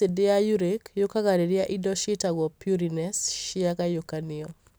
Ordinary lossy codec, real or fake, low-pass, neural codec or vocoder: none; real; none; none